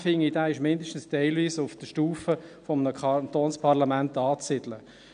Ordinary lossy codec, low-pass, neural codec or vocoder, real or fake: none; 9.9 kHz; none; real